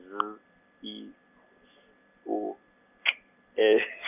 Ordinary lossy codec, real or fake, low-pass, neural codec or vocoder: none; real; 3.6 kHz; none